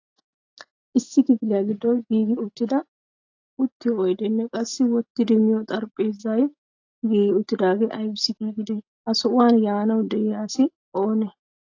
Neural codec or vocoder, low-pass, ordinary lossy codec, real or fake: none; 7.2 kHz; AAC, 48 kbps; real